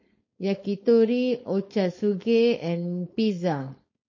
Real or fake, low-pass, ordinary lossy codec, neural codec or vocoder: fake; 7.2 kHz; MP3, 32 kbps; codec, 16 kHz, 4.8 kbps, FACodec